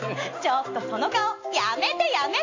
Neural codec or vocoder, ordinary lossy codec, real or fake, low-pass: none; AAC, 48 kbps; real; 7.2 kHz